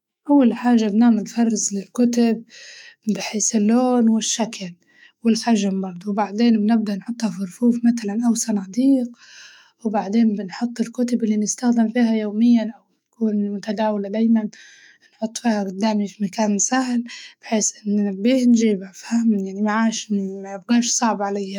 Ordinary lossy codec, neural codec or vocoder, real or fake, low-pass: none; autoencoder, 48 kHz, 128 numbers a frame, DAC-VAE, trained on Japanese speech; fake; 19.8 kHz